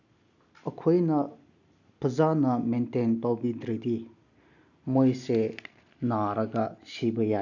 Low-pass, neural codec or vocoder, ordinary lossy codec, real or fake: 7.2 kHz; none; none; real